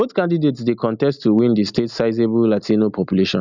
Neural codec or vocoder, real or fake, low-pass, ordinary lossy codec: none; real; 7.2 kHz; none